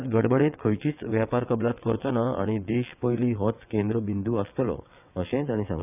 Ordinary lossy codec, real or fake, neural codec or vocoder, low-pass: none; fake; vocoder, 22.05 kHz, 80 mel bands, WaveNeXt; 3.6 kHz